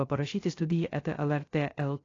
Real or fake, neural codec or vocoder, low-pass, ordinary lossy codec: fake; codec, 16 kHz, 0.3 kbps, FocalCodec; 7.2 kHz; AAC, 32 kbps